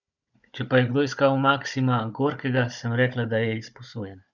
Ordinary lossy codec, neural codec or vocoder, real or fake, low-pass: none; codec, 16 kHz, 16 kbps, FunCodec, trained on Chinese and English, 50 frames a second; fake; 7.2 kHz